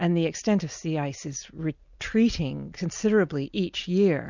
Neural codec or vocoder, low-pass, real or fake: none; 7.2 kHz; real